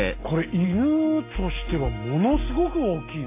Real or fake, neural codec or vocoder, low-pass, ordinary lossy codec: real; none; 3.6 kHz; MP3, 24 kbps